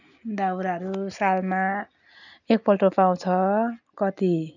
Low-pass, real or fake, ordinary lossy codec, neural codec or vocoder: 7.2 kHz; real; none; none